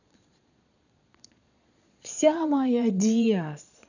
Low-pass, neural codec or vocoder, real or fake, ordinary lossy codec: 7.2 kHz; vocoder, 22.05 kHz, 80 mel bands, Vocos; fake; none